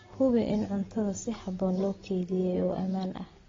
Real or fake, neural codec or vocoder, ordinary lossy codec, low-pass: real; none; AAC, 24 kbps; 7.2 kHz